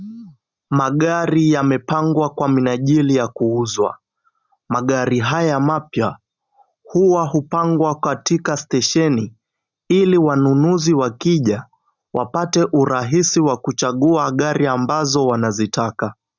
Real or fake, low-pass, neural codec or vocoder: real; 7.2 kHz; none